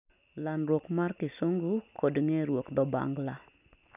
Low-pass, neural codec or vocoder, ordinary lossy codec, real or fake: 3.6 kHz; none; none; real